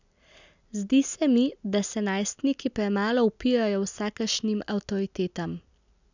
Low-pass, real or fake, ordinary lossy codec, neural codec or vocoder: 7.2 kHz; real; none; none